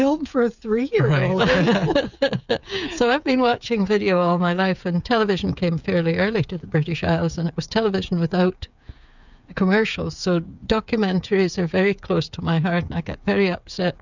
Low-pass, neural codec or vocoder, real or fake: 7.2 kHz; codec, 16 kHz, 8 kbps, FreqCodec, smaller model; fake